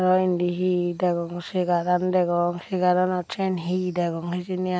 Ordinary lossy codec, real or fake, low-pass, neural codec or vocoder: none; real; none; none